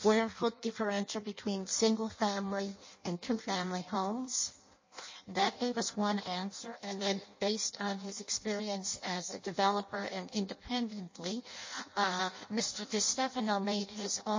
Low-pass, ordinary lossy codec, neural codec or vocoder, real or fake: 7.2 kHz; MP3, 32 kbps; codec, 16 kHz in and 24 kHz out, 0.6 kbps, FireRedTTS-2 codec; fake